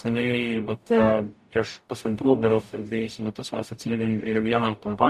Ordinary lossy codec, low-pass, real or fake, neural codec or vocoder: Opus, 64 kbps; 14.4 kHz; fake; codec, 44.1 kHz, 0.9 kbps, DAC